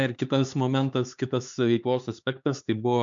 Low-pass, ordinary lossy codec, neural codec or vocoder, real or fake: 7.2 kHz; MP3, 64 kbps; codec, 16 kHz, 4 kbps, X-Codec, HuBERT features, trained on LibriSpeech; fake